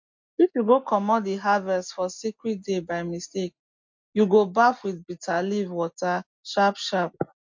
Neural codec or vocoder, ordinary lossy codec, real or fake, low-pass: none; MP3, 48 kbps; real; 7.2 kHz